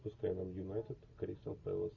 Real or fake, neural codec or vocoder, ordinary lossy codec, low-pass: real; none; AAC, 48 kbps; 7.2 kHz